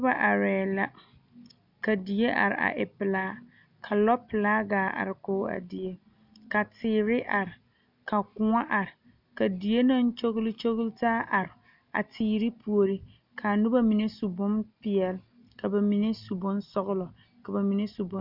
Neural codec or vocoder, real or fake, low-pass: none; real; 5.4 kHz